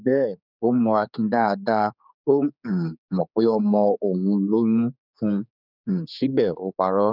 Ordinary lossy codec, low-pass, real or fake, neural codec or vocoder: none; 5.4 kHz; fake; codec, 16 kHz, 4 kbps, X-Codec, HuBERT features, trained on general audio